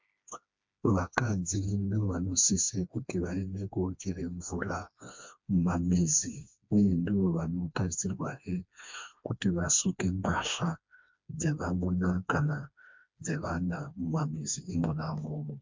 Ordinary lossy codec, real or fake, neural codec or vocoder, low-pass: MP3, 64 kbps; fake; codec, 16 kHz, 2 kbps, FreqCodec, smaller model; 7.2 kHz